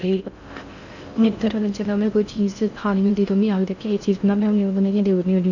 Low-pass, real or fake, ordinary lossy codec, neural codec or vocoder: 7.2 kHz; fake; none; codec, 16 kHz in and 24 kHz out, 0.6 kbps, FocalCodec, streaming, 4096 codes